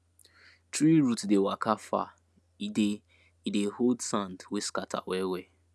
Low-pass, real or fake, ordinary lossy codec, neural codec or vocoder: none; real; none; none